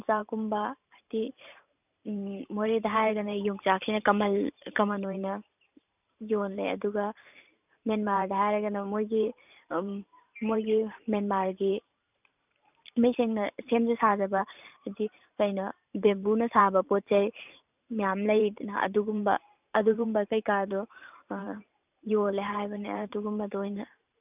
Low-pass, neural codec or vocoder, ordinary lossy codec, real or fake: 3.6 kHz; vocoder, 44.1 kHz, 128 mel bands every 512 samples, BigVGAN v2; none; fake